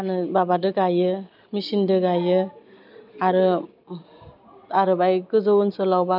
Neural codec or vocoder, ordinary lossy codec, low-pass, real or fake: none; none; 5.4 kHz; real